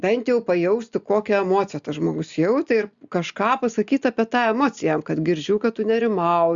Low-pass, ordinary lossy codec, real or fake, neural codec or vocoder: 7.2 kHz; Opus, 64 kbps; real; none